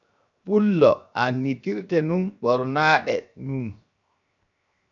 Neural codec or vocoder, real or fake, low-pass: codec, 16 kHz, 0.7 kbps, FocalCodec; fake; 7.2 kHz